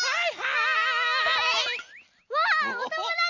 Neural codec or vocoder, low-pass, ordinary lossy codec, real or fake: none; 7.2 kHz; none; real